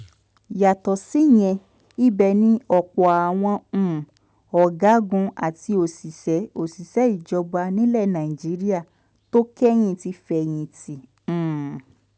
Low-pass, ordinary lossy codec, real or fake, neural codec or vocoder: none; none; real; none